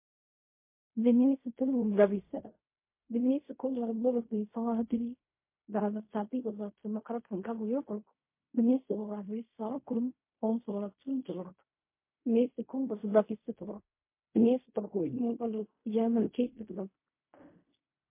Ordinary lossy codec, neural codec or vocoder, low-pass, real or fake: MP3, 24 kbps; codec, 16 kHz in and 24 kHz out, 0.4 kbps, LongCat-Audio-Codec, fine tuned four codebook decoder; 3.6 kHz; fake